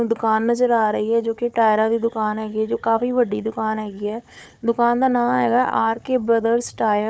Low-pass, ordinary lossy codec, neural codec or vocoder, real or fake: none; none; codec, 16 kHz, 4 kbps, FunCodec, trained on Chinese and English, 50 frames a second; fake